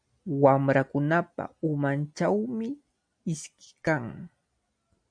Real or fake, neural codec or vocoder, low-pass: real; none; 9.9 kHz